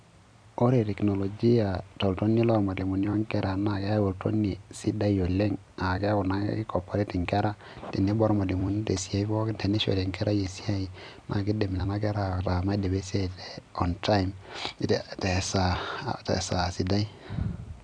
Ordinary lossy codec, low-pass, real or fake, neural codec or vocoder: none; 9.9 kHz; fake; vocoder, 44.1 kHz, 128 mel bands every 256 samples, BigVGAN v2